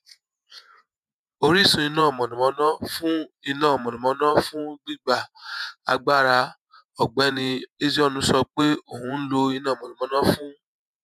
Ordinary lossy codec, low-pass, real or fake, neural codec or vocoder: none; 14.4 kHz; fake; vocoder, 48 kHz, 128 mel bands, Vocos